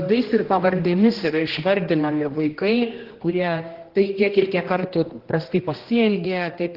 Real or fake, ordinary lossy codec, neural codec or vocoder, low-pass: fake; Opus, 16 kbps; codec, 16 kHz, 1 kbps, X-Codec, HuBERT features, trained on general audio; 5.4 kHz